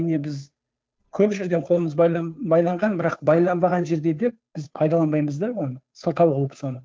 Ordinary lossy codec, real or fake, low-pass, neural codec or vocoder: none; fake; none; codec, 16 kHz, 2 kbps, FunCodec, trained on Chinese and English, 25 frames a second